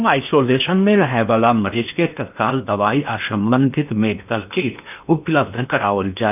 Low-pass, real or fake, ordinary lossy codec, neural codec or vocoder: 3.6 kHz; fake; none; codec, 16 kHz in and 24 kHz out, 0.8 kbps, FocalCodec, streaming, 65536 codes